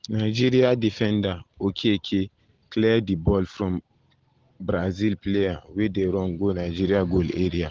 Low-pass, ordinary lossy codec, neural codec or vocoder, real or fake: 7.2 kHz; Opus, 16 kbps; none; real